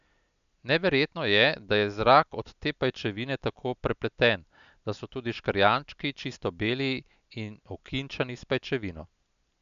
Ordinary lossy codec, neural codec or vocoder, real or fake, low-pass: none; none; real; 7.2 kHz